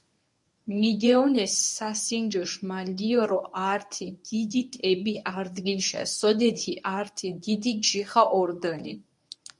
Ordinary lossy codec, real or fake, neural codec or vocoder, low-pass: MP3, 96 kbps; fake; codec, 24 kHz, 0.9 kbps, WavTokenizer, medium speech release version 1; 10.8 kHz